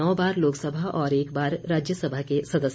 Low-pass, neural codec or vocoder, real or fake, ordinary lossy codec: none; none; real; none